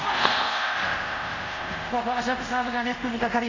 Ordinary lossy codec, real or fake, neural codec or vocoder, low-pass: MP3, 64 kbps; fake; codec, 24 kHz, 0.5 kbps, DualCodec; 7.2 kHz